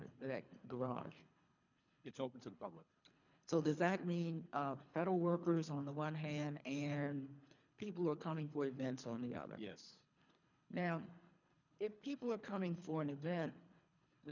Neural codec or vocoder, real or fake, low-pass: codec, 24 kHz, 3 kbps, HILCodec; fake; 7.2 kHz